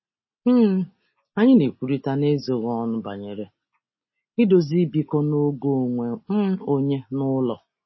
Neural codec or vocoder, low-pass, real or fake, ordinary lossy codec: none; 7.2 kHz; real; MP3, 24 kbps